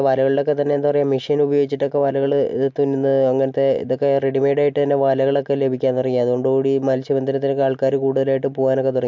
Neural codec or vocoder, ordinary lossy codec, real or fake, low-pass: none; MP3, 64 kbps; real; 7.2 kHz